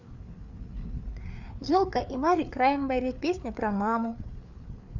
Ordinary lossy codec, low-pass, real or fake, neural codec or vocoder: none; 7.2 kHz; fake; codec, 16 kHz, 4 kbps, FreqCodec, larger model